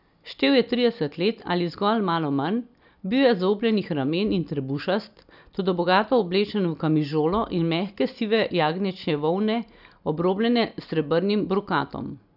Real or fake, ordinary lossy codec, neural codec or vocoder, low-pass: real; none; none; 5.4 kHz